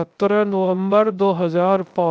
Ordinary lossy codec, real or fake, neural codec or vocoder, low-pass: none; fake; codec, 16 kHz, 0.3 kbps, FocalCodec; none